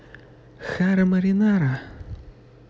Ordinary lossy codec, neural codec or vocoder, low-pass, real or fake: none; none; none; real